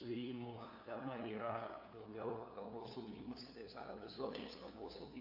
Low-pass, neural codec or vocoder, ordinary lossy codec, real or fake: 5.4 kHz; codec, 16 kHz, 2 kbps, FunCodec, trained on LibriTTS, 25 frames a second; AAC, 32 kbps; fake